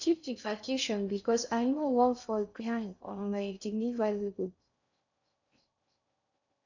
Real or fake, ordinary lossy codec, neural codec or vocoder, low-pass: fake; none; codec, 16 kHz in and 24 kHz out, 0.6 kbps, FocalCodec, streaming, 4096 codes; 7.2 kHz